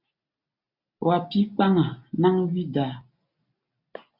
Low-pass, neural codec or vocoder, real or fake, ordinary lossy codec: 5.4 kHz; none; real; MP3, 48 kbps